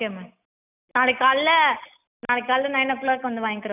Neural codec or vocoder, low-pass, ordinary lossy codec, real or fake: none; 3.6 kHz; none; real